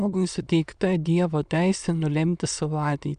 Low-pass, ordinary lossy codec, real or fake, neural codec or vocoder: 9.9 kHz; MP3, 96 kbps; fake; autoencoder, 22.05 kHz, a latent of 192 numbers a frame, VITS, trained on many speakers